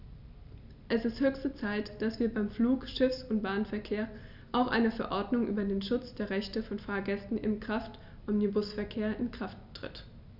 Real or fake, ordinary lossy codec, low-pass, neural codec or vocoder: real; none; 5.4 kHz; none